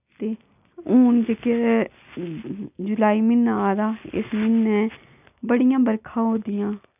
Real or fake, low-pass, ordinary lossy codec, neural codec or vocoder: real; 3.6 kHz; none; none